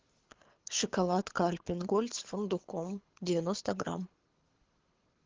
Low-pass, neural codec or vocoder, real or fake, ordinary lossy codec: 7.2 kHz; codec, 24 kHz, 6 kbps, HILCodec; fake; Opus, 16 kbps